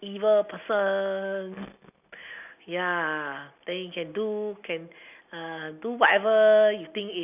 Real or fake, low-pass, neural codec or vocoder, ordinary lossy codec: real; 3.6 kHz; none; none